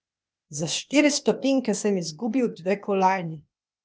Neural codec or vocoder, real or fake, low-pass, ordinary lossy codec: codec, 16 kHz, 0.8 kbps, ZipCodec; fake; none; none